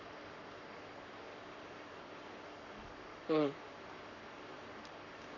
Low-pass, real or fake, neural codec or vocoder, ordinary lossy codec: 7.2 kHz; fake; codec, 44.1 kHz, 7.8 kbps, Pupu-Codec; none